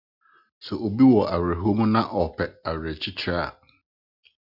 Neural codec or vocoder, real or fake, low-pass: none; real; 5.4 kHz